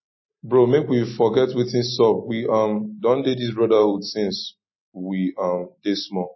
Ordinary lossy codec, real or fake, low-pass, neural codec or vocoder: MP3, 24 kbps; real; 7.2 kHz; none